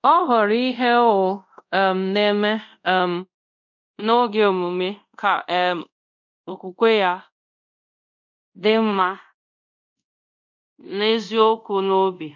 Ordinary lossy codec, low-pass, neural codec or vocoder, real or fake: none; 7.2 kHz; codec, 24 kHz, 0.5 kbps, DualCodec; fake